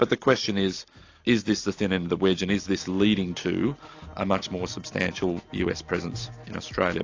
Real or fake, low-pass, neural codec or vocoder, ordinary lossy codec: real; 7.2 kHz; none; AAC, 48 kbps